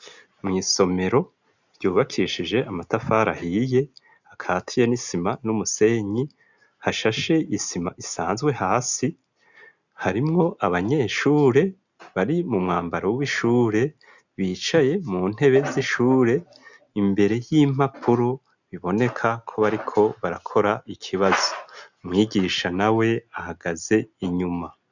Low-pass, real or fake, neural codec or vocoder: 7.2 kHz; real; none